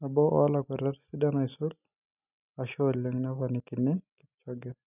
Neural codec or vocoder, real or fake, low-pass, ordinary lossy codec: none; real; 3.6 kHz; none